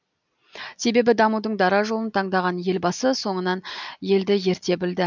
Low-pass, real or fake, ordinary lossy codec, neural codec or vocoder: 7.2 kHz; real; none; none